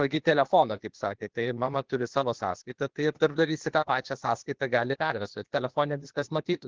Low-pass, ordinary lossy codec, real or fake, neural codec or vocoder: 7.2 kHz; Opus, 16 kbps; fake; codec, 16 kHz, 0.8 kbps, ZipCodec